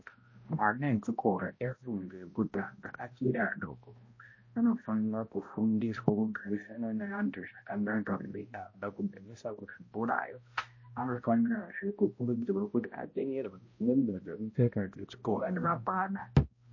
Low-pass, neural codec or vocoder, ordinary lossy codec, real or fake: 7.2 kHz; codec, 16 kHz, 0.5 kbps, X-Codec, HuBERT features, trained on balanced general audio; MP3, 32 kbps; fake